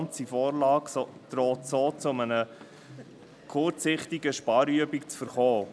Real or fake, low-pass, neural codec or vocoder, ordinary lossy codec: real; none; none; none